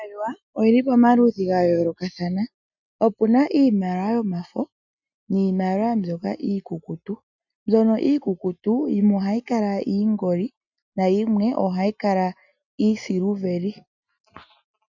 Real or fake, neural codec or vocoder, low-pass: real; none; 7.2 kHz